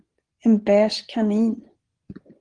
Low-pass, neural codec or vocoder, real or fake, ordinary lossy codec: 9.9 kHz; vocoder, 24 kHz, 100 mel bands, Vocos; fake; Opus, 16 kbps